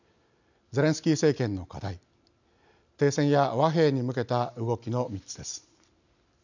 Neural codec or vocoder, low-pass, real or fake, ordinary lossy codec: none; 7.2 kHz; real; none